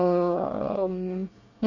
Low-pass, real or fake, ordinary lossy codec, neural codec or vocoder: 7.2 kHz; fake; none; codec, 24 kHz, 1 kbps, SNAC